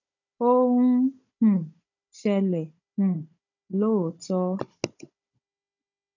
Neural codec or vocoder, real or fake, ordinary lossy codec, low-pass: codec, 16 kHz, 16 kbps, FunCodec, trained on Chinese and English, 50 frames a second; fake; none; 7.2 kHz